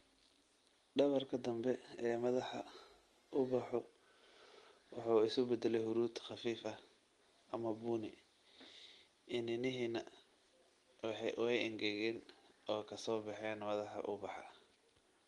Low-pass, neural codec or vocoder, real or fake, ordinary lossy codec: 10.8 kHz; none; real; Opus, 24 kbps